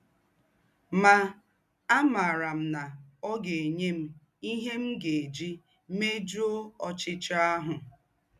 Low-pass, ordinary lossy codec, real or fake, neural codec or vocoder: 14.4 kHz; none; real; none